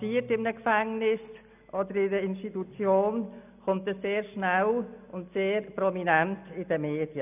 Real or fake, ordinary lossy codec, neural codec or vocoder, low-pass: real; none; none; 3.6 kHz